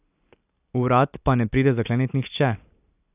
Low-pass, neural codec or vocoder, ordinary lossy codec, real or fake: 3.6 kHz; none; none; real